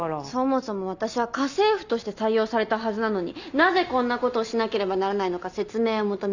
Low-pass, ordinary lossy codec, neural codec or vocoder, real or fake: 7.2 kHz; none; none; real